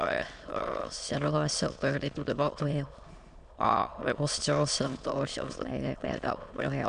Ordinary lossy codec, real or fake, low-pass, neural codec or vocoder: MP3, 64 kbps; fake; 9.9 kHz; autoencoder, 22.05 kHz, a latent of 192 numbers a frame, VITS, trained on many speakers